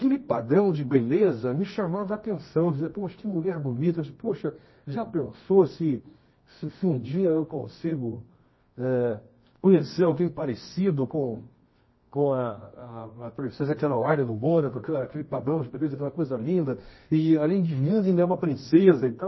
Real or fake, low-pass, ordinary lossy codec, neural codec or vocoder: fake; 7.2 kHz; MP3, 24 kbps; codec, 24 kHz, 0.9 kbps, WavTokenizer, medium music audio release